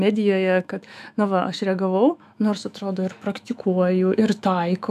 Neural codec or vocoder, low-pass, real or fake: autoencoder, 48 kHz, 128 numbers a frame, DAC-VAE, trained on Japanese speech; 14.4 kHz; fake